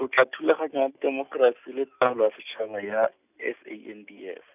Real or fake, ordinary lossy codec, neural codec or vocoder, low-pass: real; none; none; 3.6 kHz